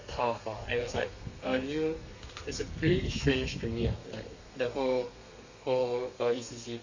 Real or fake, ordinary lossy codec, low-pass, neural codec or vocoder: fake; AAC, 48 kbps; 7.2 kHz; codec, 32 kHz, 1.9 kbps, SNAC